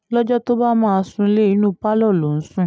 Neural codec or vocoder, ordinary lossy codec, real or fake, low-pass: none; none; real; none